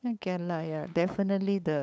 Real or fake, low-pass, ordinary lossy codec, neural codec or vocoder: fake; none; none; codec, 16 kHz, 8 kbps, FunCodec, trained on LibriTTS, 25 frames a second